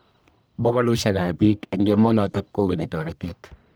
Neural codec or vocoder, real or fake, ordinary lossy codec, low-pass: codec, 44.1 kHz, 1.7 kbps, Pupu-Codec; fake; none; none